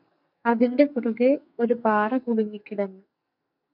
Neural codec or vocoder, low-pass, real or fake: codec, 44.1 kHz, 2.6 kbps, SNAC; 5.4 kHz; fake